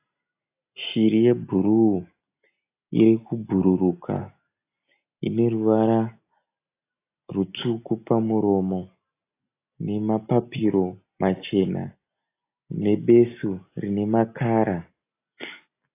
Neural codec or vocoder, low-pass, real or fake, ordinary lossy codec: none; 3.6 kHz; real; AAC, 24 kbps